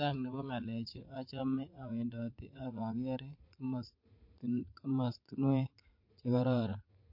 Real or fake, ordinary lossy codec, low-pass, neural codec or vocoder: fake; MP3, 32 kbps; 5.4 kHz; vocoder, 44.1 kHz, 80 mel bands, Vocos